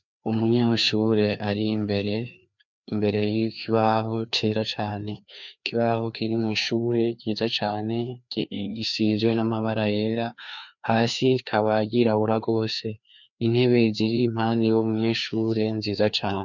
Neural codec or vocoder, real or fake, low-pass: codec, 16 kHz, 2 kbps, FreqCodec, larger model; fake; 7.2 kHz